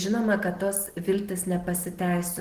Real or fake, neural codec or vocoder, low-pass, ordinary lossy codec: real; none; 14.4 kHz; Opus, 16 kbps